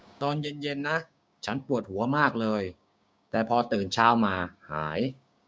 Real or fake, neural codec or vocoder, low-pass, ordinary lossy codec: fake; codec, 16 kHz, 6 kbps, DAC; none; none